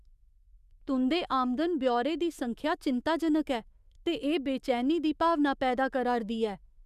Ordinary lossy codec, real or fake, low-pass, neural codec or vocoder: Opus, 64 kbps; fake; 14.4 kHz; autoencoder, 48 kHz, 128 numbers a frame, DAC-VAE, trained on Japanese speech